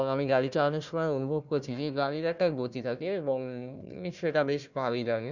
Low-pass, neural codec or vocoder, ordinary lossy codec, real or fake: 7.2 kHz; codec, 16 kHz, 1 kbps, FunCodec, trained on Chinese and English, 50 frames a second; none; fake